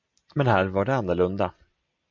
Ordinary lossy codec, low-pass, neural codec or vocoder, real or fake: MP3, 64 kbps; 7.2 kHz; none; real